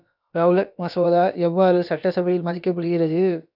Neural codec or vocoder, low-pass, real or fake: codec, 16 kHz, about 1 kbps, DyCAST, with the encoder's durations; 5.4 kHz; fake